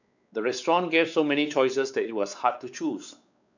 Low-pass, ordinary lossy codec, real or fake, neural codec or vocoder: 7.2 kHz; none; fake; codec, 16 kHz, 4 kbps, X-Codec, WavLM features, trained on Multilingual LibriSpeech